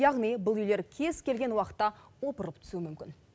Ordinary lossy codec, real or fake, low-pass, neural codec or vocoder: none; real; none; none